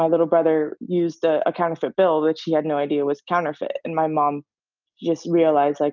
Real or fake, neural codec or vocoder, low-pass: real; none; 7.2 kHz